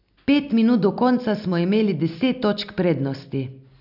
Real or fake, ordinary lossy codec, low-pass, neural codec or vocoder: real; none; 5.4 kHz; none